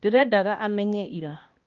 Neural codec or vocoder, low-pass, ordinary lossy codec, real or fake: codec, 16 kHz, 1 kbps, X-Codec, HuBERT features, trained on balanced general audio; 7.2 kHz; Opus, 24 kbps; fake